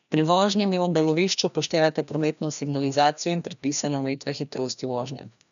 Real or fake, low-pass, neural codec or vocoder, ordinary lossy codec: fake; 7.2 kHz; codec, 16 kHz, 1 kbps, FreqCodec, larger model; none